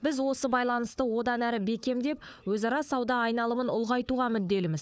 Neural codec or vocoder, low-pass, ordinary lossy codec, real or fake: codec, 16 kHz, 4 kbps, FunCodec, trained on Chinese and English, 50 frames a second; none; none; fake